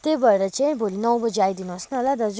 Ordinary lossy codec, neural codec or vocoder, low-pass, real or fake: none; none; none; real